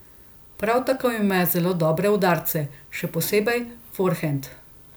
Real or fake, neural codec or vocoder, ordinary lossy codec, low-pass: real; none; none; none